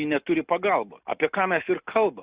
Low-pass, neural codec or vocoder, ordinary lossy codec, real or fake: 3.6 kHz; none; Opus, 64 kbps; real